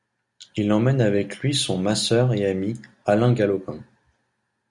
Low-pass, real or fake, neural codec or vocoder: 10.8 kHz; real; none